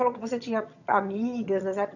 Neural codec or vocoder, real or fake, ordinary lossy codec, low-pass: vocoder, 22.05 kHz, 80 mel bands, HiFi-GAN; fake; none; 7.2 kHz